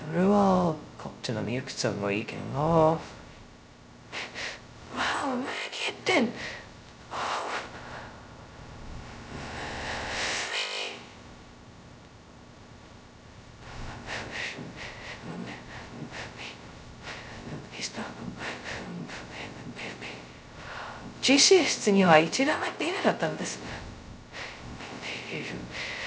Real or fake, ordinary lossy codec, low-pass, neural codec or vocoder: fake; none; none; codec, 16 kHz, 0.2 kbps, FocalCodec